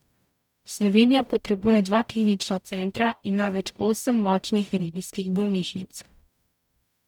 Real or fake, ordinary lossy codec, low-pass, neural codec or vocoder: fake; none; 19.8 kHz; codec, 44.1 kHz, 0.9 kbps, DAC